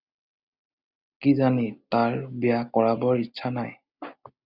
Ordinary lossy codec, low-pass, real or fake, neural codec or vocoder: Opus, 64 kbps; 5.4 kHz; real; none